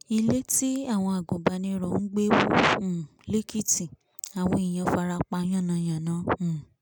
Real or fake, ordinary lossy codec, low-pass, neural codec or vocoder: real; none; none; none